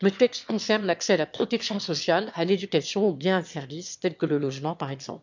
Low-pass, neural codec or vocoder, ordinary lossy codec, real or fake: 7.2 kHz; autoencoder, 22.05 kHz, a latent of 192 numbers a frame, VITS, trained on one speaker; MP3, 64 kbps; fake